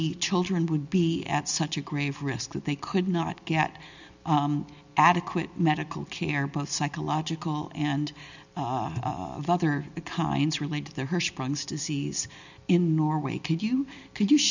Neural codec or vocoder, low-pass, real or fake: none; 7.2 kHz; real